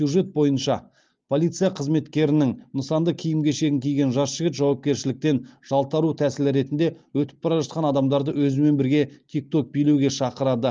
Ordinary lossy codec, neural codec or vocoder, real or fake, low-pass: Opus, 32 kbps; none; real; 7.2 kHz